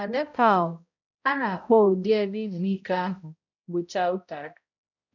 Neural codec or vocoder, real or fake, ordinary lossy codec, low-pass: codec, 16 kHz, 0.5 kbps, X-Codec, HuBERT features, trained on balanced general audio; fake; none; 7.2 kHz